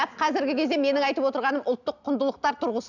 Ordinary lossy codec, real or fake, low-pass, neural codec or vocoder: none; real; 7.2 kHz; none